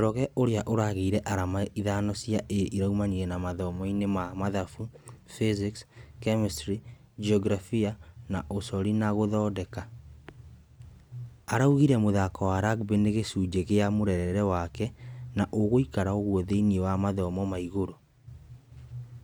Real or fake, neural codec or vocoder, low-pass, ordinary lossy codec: real; none; none; none